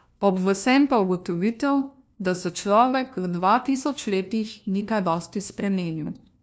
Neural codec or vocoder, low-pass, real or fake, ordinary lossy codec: codec, 16 kHz, 1 kbps, FunCodec, trained on LibriTTS, 50 frames a second; none; fake; none